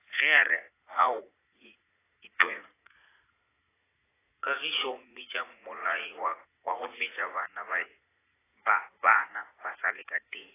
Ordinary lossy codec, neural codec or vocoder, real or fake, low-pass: AAC, 16 kbps; vocoder, 44.1 kHz, 80 mel bands, Vocos; fake; 3.6 kHz